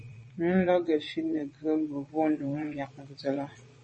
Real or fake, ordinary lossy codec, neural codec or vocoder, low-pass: fake; MP3, 32 kbps; vocoder, 22.05 kHz, 80 mel bands, Vocos; 9.9 kHz